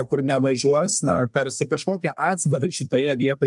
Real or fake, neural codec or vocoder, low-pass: fake; codec, 24 kHz, 1 kbps, SNAC; 10.8 kHz